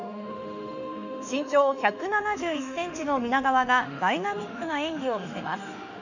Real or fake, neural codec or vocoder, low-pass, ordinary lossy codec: fake; autoencoder, 48 kHz, 32 numbers a frame, DAC-VAE, trained on Japanese speech; 7.2 kHz; none